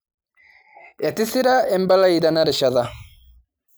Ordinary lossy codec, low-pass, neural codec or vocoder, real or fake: none; none; none; real